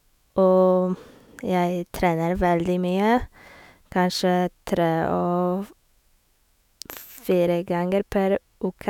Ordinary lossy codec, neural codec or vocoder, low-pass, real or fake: none; autoencoder, 48 kHz, 128 numbers a frame, DAC-VAE, trained on Japanese speech; 19.8 kHz; fake